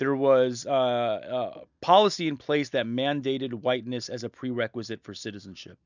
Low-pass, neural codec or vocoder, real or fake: 7.2 kHz; none; real